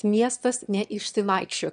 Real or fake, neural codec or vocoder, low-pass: fake; autoencoder, 22.05 kHz, a latent of 192 numbers a frame, VITS, trained on one speaker; 9.9 kHz